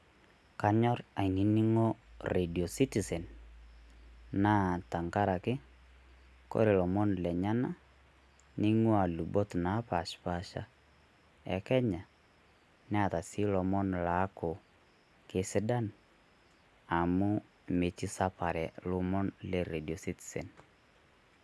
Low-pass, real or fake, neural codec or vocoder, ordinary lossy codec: none; real; none; none